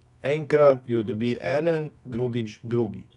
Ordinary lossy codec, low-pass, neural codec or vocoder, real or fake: none; 10.8 kHz; codec, 24 kHz, 0.9 kbps, WavTokenizer, medium music audio release; fake